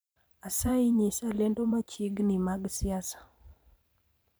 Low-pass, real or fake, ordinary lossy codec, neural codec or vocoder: none; fake; none; vocoder, 44.1 kHz, 128 mel bands every 512 samples, BigVGAN v2